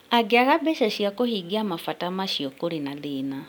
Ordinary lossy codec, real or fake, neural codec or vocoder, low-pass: none; real; none; none